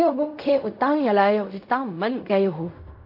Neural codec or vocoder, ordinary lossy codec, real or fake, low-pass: codec, 16 kHz in and 24 kHz out, 0.4 kbps, LongCat-Audio-Codec, fine tuned four codebook decoder; MP3, 32 kbps; fake; 5.4 kHz